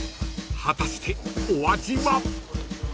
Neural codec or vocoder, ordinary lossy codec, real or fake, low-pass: none; none; real; none